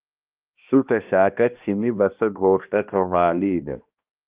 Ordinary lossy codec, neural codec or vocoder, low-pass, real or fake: Opus, 24 kbps; codec, 16 kHz, 1 kbps, X-Codec, HuBERT features, trained on balanced general audio; 3.6 kHz; fake